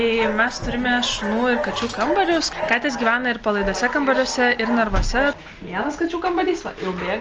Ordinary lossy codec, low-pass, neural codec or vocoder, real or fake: Opus, 24 kbps; 7.2 kHz; none; real